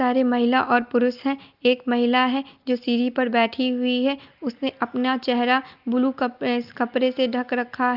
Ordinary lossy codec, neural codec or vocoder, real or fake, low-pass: Opus, 24 kbps; none; real; 5.4 kHz